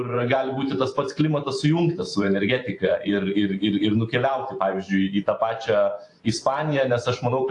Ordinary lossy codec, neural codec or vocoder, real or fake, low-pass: AAC, 48 kbps; vocoder, 44.1 kHz, 128 mel bands every 512 samples, BigVGAN v2; fake; 10.8 kHz